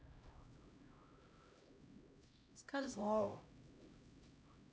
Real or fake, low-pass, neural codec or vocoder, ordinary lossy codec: fake; none; codec, 16 kHz, 0.5 kbps, X-Codec, HuBERT features, trained on LibriSpeech; none